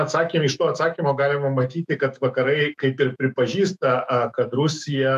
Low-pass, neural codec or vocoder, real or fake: 14.4 kHz; autoencoder, 48 kHz, 128 numbers a frame, DAC-VAE, trained on Japanese speech; fake